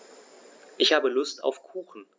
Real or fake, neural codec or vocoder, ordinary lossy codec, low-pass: real; none; none; none